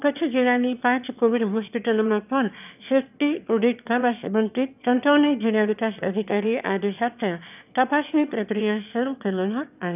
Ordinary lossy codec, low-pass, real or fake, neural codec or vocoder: none; 3.6 kHz; fake; autoencoder, 22.05 kHz, a latent of 192 numbers a frame, VITS, trained on one speaker